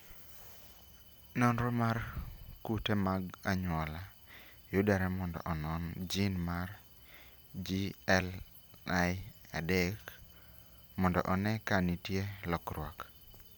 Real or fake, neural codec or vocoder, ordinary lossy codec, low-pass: real; none; none; none